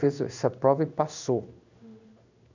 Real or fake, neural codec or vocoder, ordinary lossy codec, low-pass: fake; codec, 16 kHz in and 24 kHz out, 1 kbps, XY-Tokenizer; none; 7.2 kHz